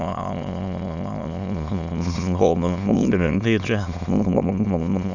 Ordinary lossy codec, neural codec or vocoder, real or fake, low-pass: none; autoencoder, 22.05 kHz, a latent of 192 numbers a frame, VITS, trained on many speakers; fake; 7.2 kHz